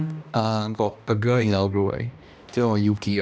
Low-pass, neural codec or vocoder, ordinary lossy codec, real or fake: none; codec, 16 kHz, 1 kbps, X-Codec, HuBERT features, trained on balanced general audio; none; fake